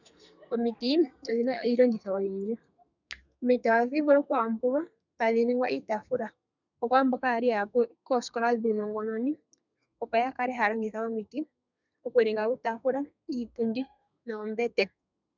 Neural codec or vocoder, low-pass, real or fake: codec, 32 kHz, 1.9 kbps, SNAC; 7.2 kHz; fake